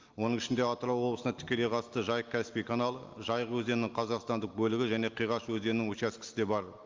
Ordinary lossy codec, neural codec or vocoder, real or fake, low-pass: Opus, 32 kbps; none; real; 7.2 kHz